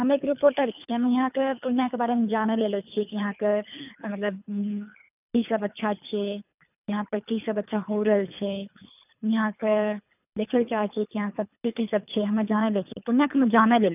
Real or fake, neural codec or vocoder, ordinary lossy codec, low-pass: fake; codec, 24 kHz, 3 kbps, HILCodec; none; 3.6 kHz